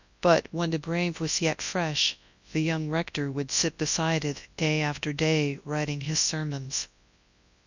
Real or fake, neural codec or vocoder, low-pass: fake; codec, 24 kHz, 0.9 kbps, WavTokenizer, large speech release; 7.2 kHz